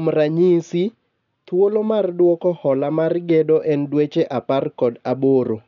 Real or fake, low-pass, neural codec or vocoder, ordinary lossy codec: real; 7.2 kHz; none; none